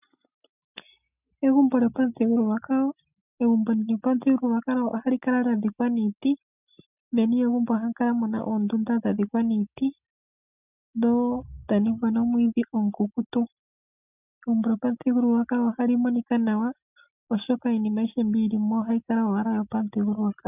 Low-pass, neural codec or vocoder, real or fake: 3.6 kHz; none; real